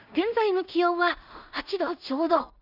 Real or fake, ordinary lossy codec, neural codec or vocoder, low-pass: fake; none; codec, 16 kHz in and 24 kHz out, 0.4 kbps, LongCat-Audio-Codec, two codebook decoder; 5.4 kHz